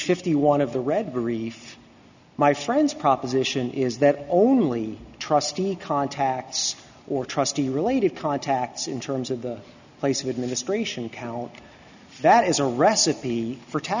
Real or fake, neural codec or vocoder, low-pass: real; none; 7.2 kHz